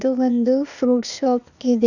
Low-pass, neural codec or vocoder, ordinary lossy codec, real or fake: 7.2 kHz; codec, 16 kHz, 0.8 kbps, ZipCodec; none; fake